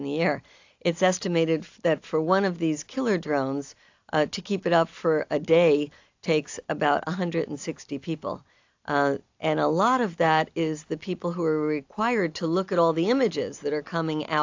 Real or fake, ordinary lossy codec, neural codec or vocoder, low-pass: real; AAC, 48 kbps; none; 7.2 kHz